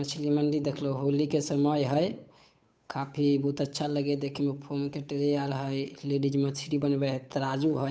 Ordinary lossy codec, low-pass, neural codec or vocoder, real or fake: none; none; codec, 16 kHz, 8 kbps, FunCodec, trained on Chinese and English, 25 frames a second; fake